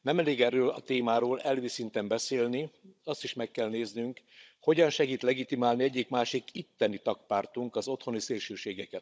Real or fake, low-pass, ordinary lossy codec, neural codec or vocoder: fake; none; none; codec, 16 kHz, 16 kbps, FunCodec, trained on Chinese and English, 50 frames a second